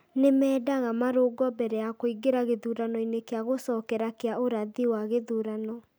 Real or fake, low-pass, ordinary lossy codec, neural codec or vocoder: real; none; none; none